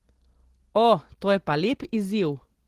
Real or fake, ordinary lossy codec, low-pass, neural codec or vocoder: real; Opus, 16 kbps; 14.4 kHz; none